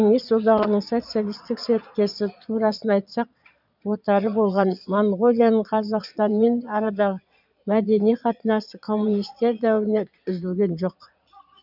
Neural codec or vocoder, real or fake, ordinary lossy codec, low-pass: vocoder, 22.05 kHz, 80 mel bands, Vocos; fake; none; 5.4 kHz